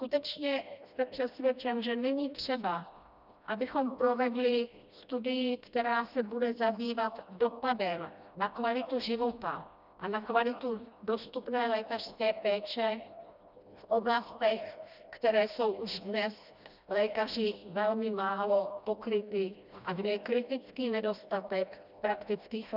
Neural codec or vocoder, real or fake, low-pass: codec, 16 kHz, 1 kbps, FreqCodec, smaller model; fake; 5.4 kHz